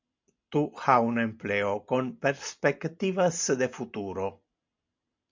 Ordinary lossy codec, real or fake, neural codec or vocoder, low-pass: MP3, 64 kbps; real; none; 7.2 kHz